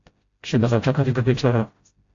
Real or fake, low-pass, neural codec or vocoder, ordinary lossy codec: fake; 7.2 kHz; codec, 16 kHz, 0.5 kbps, FreqCodec, smaller model; AAC, 48 kbps